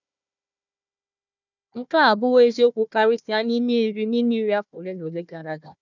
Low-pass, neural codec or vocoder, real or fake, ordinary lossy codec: 7.2 kHz; codec, 16 kHz, 1 kbps, FunCodec, trained on Chinese and English, 50 frames a second; fake; none